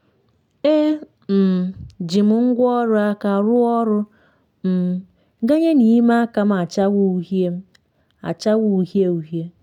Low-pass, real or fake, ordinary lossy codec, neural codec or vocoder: 19.8 kHz; real; none; none